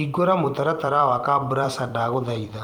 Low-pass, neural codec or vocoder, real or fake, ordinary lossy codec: 19.8 kHz; vocoder, 44.1 kHz, 128 mel bands every 256 samples, BigVGAN v2; fake; Opus, 32 kbps